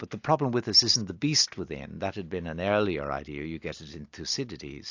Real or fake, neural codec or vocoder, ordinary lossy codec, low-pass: real; none; AAC, 48 kbps; 7.2 kHz